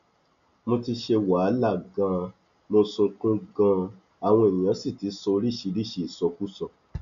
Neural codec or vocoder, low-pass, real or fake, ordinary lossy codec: none; 7.2 kHz; real; AAC, 96 kbps